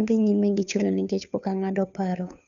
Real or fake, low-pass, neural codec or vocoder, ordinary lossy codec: fake; 7.2 kHz; codec, 16 kHz, 2 kbps, FunCodec, trained on Chinese and English, 25 frames a second; none